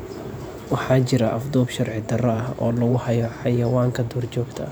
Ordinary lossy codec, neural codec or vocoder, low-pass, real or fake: none; vocoder, 44.1 kHz, 128 mel bands every 512 samples, BigVGAN v2; none; fake